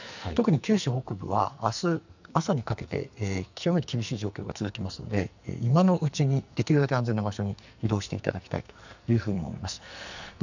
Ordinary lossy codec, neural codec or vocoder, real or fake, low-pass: none; codec, 44.1 kHz, 2.6 kbps, SNAC; fake; 7.2 kHz